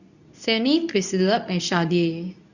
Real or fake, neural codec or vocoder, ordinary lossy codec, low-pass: fake; codec, 24 kHz, 0.9 kbps, WavTokenizer, medium speech release version 2; none; 7.2 kHz